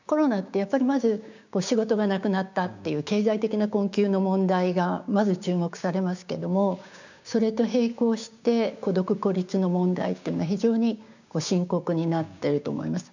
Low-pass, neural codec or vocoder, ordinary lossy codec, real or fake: 7.2 kHz; codec, 16 kHz, 6 kbps, DAC; none; fake